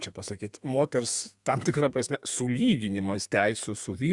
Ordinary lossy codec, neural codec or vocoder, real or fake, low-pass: Opus, 64 kbps; codec, 32 kHz, 1.9 kbps, SNAC; fake; 10.8 kHz